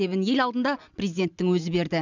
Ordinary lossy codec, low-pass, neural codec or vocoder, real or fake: none; 7.2 kHz; none; real